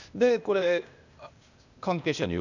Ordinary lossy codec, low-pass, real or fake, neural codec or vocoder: none; 7.2 kHz; fake; codec, 16 kHz, 0.8 kbps, ZipCodec